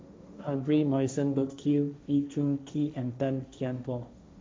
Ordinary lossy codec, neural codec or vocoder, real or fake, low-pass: none; codec, 16 kHz, 1.1 kbps, Voila-Tokenizer; fake; none